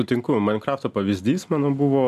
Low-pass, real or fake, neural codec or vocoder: 14.4 kHz; real; none